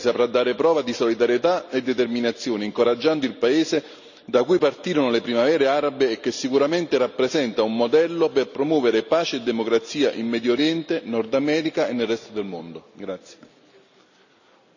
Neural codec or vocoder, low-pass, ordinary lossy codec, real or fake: none; 7.2 kHz; none; real